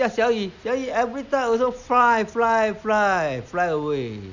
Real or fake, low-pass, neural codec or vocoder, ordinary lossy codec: real; 7.2 kHz; none; Opus, 64 kbps